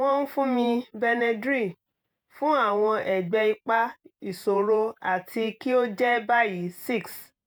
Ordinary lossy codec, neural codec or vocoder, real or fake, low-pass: none; vocoder, 48 kHz, 128 mel bands, Vocos; fake; none